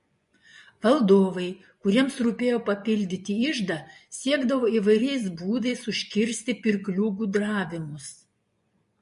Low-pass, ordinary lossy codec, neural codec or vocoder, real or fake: 10.8 kHz; MP3, 48 kbps; vocoder, 24 kHz, 100 mel bands, Vocos; fake